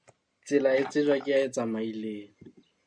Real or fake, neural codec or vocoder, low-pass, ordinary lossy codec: real; none; 9.9 kHz; Opus, 64 kbps